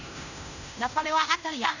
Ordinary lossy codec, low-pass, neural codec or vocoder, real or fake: none; 7.2 kHz; codec, 16 kHz in and 24 kHz out, 0.9 kbps, LongCat-Audio-Codec, fine tuned four codebook decoder; fake